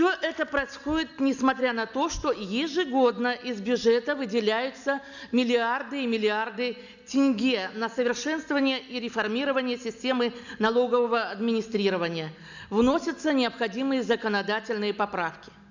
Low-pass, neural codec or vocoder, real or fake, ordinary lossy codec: 7.2 kHz; none; real; none